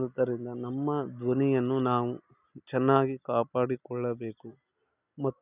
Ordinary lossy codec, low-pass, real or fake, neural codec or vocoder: none; 3.6 kHz; real; none